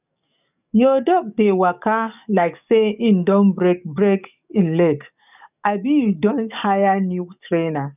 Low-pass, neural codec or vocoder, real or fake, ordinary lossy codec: 3.6 kHz; codec, 44.1 kHz, 7.8 kbps, DAC; fake; none